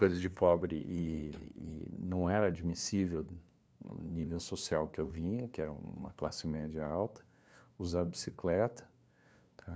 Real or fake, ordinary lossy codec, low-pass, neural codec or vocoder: fake; none; none; codec, 16 kHz, 2 kbps, FunCodec, trained on LibriTTS, 25 frames a second